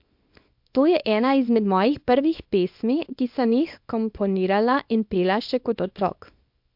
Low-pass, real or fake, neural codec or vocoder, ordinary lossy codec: 5.4 kHz; fake; codec, 24 kHz, 0.9 kbps, WavTokenizer, small release; MP3, 48 kbps